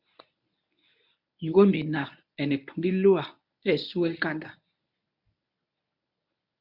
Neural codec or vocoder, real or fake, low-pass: codec, 24 kHz, 0.9 kbps, WavTokenizer, medium speech release version 1; fake; 5.4 kHz